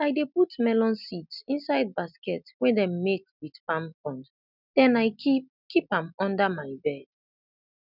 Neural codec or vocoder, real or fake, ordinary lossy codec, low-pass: none; real; none; 5.4 kHz